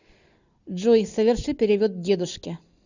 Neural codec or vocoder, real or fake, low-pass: none; real; 7.2 kHz